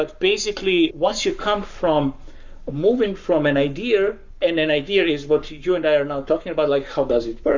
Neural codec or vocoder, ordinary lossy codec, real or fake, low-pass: codec, 44.1 kHz, 7.8 kbps, Pupu-Codec; Opus, 64 kbps; fake; 7.2 kHz